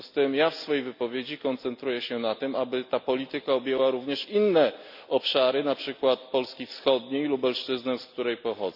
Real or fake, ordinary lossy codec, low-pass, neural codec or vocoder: real; none; 5.4 kHz; none